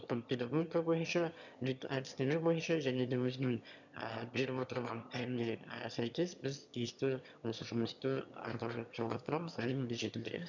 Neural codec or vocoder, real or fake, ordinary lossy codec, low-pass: autoencoder, 22.05 kHz, a latent of 192 numbers a frame, VITS, trained on one speaker; fake; none; 7.2 kHz